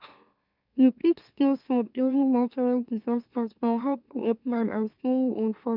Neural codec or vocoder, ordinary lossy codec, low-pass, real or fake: autoencoder, 44.1 kHz, a latent of 192 numbers a frame, MeloTTS; none; 5.4 kHz; fake